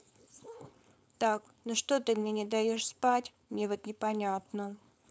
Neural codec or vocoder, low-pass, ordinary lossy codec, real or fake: codec, 16 kHz, 4.8 kbps, FACodec; none; none; fake